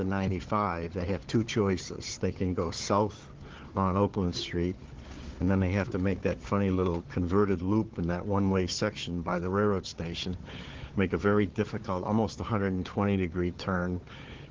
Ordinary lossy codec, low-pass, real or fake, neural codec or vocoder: Opus, 16 kbps; 7.2 kHz; fake; codec, 16 kHz, 4 kbps, FunCodec, trained on Chinese and English, 50 frames a second